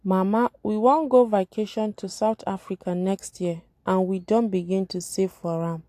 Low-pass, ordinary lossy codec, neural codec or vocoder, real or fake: 14.4 kHz; AAC, 64 kbps; none; real